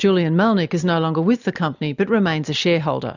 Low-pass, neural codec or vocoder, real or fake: 7.2 kHz; none; real